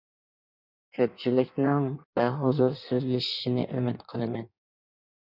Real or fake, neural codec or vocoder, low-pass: fake; codec, 16 kHz in and 24 kHz out, 1.1 kbps, FireRedTTS-2 codec; 5.4 kHz